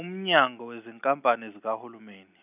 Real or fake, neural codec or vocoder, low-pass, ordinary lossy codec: real; none; 3.6 kHz; none